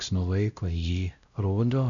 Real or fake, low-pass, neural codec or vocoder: fake; 7.2 kHz; codec, 16 kHz, 0.5 kbps, X-Codec, WavLM features, trained on Multilingual LibriSpeech